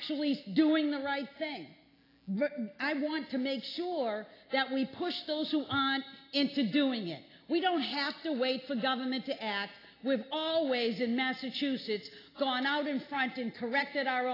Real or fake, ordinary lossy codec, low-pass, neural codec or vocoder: real; AAC, 24 kbps; 5.4 kHz; none